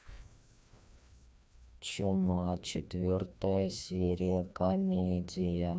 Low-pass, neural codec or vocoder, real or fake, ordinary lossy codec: none; codec, 16 kHz, 1 kbps, FreqCodec, larger model; fake; none